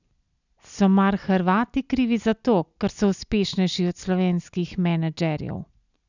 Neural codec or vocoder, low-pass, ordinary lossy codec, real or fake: none; 7.2 kHz; none; real